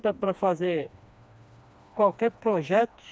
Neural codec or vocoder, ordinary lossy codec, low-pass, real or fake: codec, 16 kHz, 2 kbps, FreqCodec, smaller model; none; none; fake